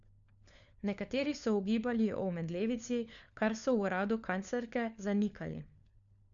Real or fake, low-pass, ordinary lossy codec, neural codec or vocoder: fake; 7.2 kHz; none; codec, 16 kHz, 4 kbps, FunCodec, trained on LibriTTS, 50 frames a second